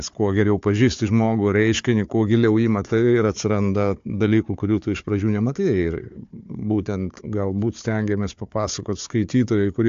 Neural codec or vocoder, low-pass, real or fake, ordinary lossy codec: codec, 16 kHz, 4 kbps, FunCodec, trained on Chinese and English, 50 frames a second; 7.2 kHz; fake; AAC, 64 kbps